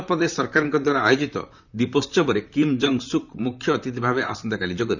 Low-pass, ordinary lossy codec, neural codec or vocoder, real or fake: 7.2 kHz; none; vocoder, 44.1 kHz, 128 mel bands, Pupu-Vocoder; fake